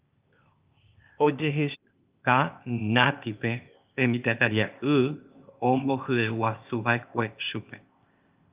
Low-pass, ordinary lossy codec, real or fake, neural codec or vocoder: 3.6 kHz; Opus, 32 kbps; fake; codec, 16 kHz, 0.8 kbps, ZipCodec